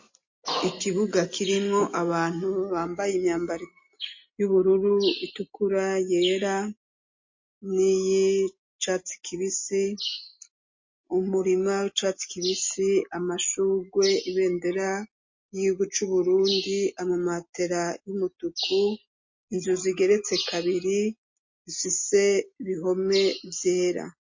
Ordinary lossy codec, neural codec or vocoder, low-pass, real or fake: MP3, 32 kbps; none; 7.2 kHz; real